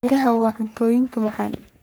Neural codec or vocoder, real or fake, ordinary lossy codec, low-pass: codec, 44.1 kHz, 3.4 kbps, Pupu-Codec; fake; none; none